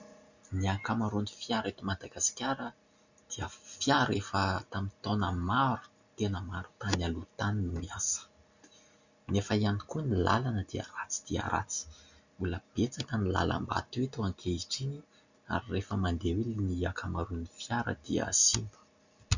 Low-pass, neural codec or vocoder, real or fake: 7.2 kHz; none; real